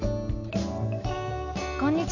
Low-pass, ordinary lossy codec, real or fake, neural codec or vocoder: 7.2 kHz; none; real; none